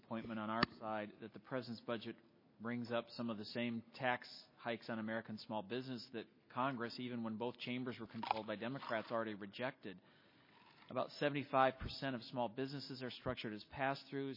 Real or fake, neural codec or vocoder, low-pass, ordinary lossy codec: real; none; 5.4 kHz; MP3, 24 kbps